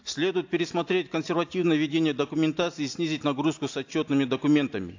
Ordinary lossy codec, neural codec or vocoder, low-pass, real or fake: AAC, 48 kbps; none; 7.2 kHz; real